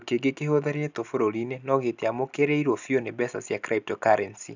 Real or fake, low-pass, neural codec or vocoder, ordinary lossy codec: real; 7.2 kHz; none; none